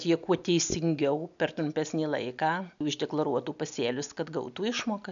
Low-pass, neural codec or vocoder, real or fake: 7.2 kHz; none; real